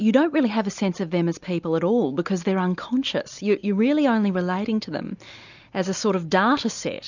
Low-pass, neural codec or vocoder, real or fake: 7.2 kHz; none; real